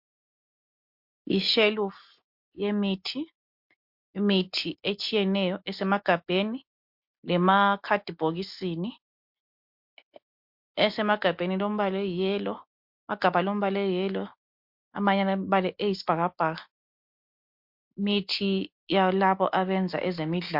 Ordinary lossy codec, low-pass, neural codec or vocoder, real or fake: MP3, 48 kbps; 5.4 kHz; none; real